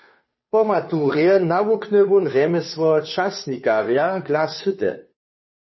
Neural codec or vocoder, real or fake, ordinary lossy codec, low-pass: codec, 16 kHz, 2 kbps, FunCodec, trained on Chinese and English, 25 frames a second; fake; MP3, 24 kbps; 7.2 kHz